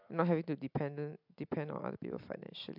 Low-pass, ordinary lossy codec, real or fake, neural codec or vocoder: 5.4 kHz; MP3, 48 kbps; real; none